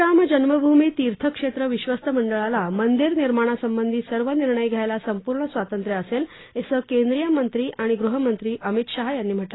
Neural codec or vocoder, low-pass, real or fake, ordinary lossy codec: none; 7.2 kHz; real; AAC, 16 kbps